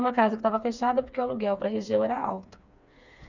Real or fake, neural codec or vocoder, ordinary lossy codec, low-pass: fake; codec, 16 kHz, 4 kbps, FreqCodec, smaller model; none; 7.2 kHz